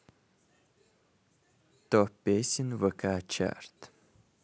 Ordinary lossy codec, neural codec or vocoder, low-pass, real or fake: none; none; none; real